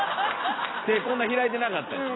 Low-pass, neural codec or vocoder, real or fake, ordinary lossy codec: 7.2 kHz; none; real; AAC, 16 kbps